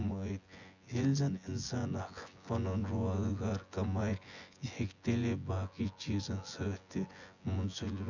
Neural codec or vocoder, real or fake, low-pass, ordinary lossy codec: vocoder, 24 kHz, 100 mel bands, Vocos; fake; 7.2 kHz; Opus, 64 kbps